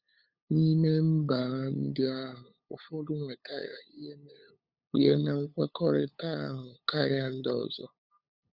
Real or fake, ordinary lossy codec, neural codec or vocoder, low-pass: fake; Opus, 64 kbps; codec, 16 kHz, 8 kbps, FunCodec, trained on LibriTTS, 25 frames a second; 5.4 kHz